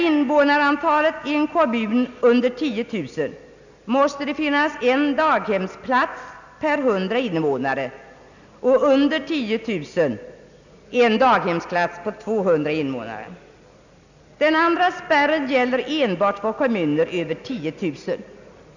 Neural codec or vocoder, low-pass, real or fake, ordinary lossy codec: none; 7.2 kHz; real; none